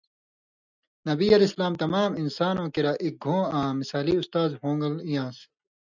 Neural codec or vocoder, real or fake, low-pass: none; real; 7.2 kHz